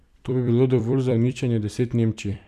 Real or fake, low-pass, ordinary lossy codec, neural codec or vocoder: fake; 14.4 kHz; none; vocoder, 44.1 kHz, 128 mel bands every 256 samples, BigVGAN v2